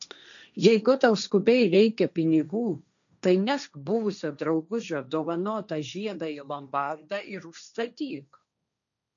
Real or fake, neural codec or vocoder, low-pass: fake; codec, 16 kHz, 1.1 kbps, Voila-Tokenizer; 7.2 kHz